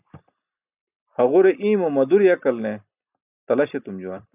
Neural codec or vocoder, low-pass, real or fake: none; 3.6 kHz; real